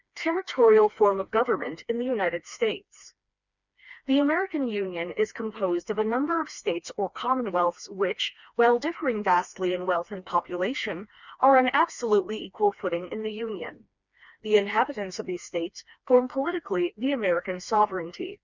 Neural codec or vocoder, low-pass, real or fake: codec, 16 kHz, 2 kbps, FreqCodec, smaller model; 7.2 kHz; fake